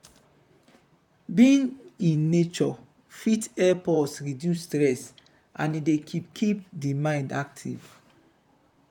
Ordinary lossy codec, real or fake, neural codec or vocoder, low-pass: none; fake; vocoder, 44.1 kHz, 128 mel bands, Pupu-Vocoder; 19.8 kHz